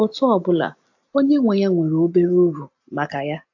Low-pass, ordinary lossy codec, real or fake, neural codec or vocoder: 7.2 kHz; AAC, 48 kbps; real; none